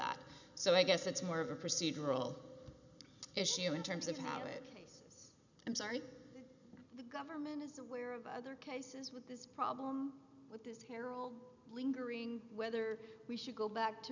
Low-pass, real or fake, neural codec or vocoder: 7.2 kHz; real; none